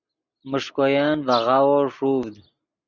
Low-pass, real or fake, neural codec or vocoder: 7.2 kHz; real; none